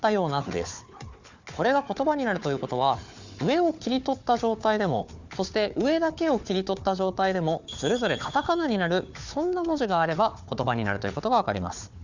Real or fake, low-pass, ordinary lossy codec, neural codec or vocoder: fake; 7.2 kHz; Opus, 64 kbps; codec, 16 kHz, 4 kbps, FunCodec, trained on Chinese and English, 50 frames a second